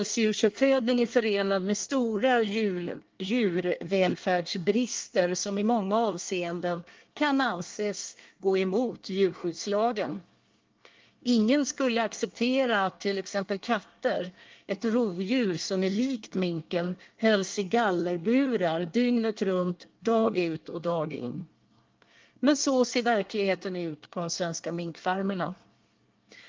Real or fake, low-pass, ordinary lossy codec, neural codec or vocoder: fake; 7.2 kHz; Opus, 32 kbps; codec, 24 kHz, 1 kbps, SNAC